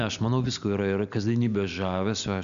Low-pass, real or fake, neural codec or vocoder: 7.2 kHz; real; none